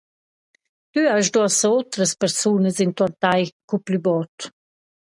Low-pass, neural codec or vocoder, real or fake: 10.8 kHz; none; real